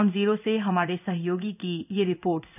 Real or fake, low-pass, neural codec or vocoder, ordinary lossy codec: fake; 3.6 kHz; codec, 16 kHz in and 24 kHz out, 1 kbps, XY-Tokenizer; none